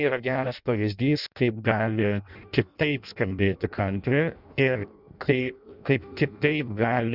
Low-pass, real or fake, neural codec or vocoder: 5.4 kHz; fake; codec, 16 kHz in and 24 kHz out, 0.6 kbps, FireRedTTS-2 codec